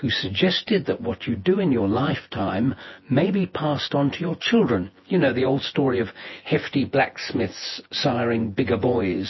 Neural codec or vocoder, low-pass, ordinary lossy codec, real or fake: vocoder, 24 kHz, 100 mel bands, Vocos; 7.2 kHz; MP3, 24 kbps; fake